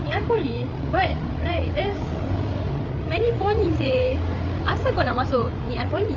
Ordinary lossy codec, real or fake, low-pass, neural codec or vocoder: none; fake; 7.2 kHz; codec, 16 kHz, 8 kbps, FreqCodec, larger model